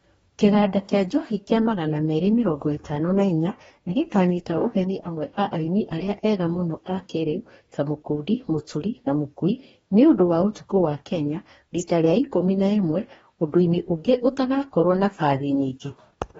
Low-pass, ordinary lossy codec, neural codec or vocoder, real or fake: 19.8 kHz; AAC, 24 kbps; codec, 44.1 kHz, 2.6 kbps, DAC; fake